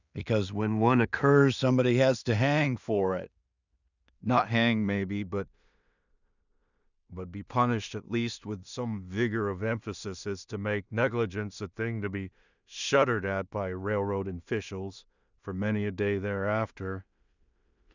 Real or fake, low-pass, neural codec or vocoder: fake; 7.2 kHz; codec, 16 kHz in and 24 kHz out, 0.4 kbps, LongCat-Audio-Codec, two codebook decoder